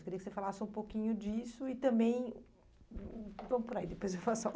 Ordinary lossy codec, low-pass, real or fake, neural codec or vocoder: none; none; real; none